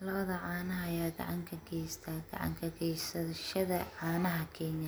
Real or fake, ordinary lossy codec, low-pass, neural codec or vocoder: real; none; none; none